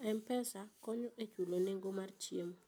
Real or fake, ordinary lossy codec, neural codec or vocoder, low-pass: real; none; none; none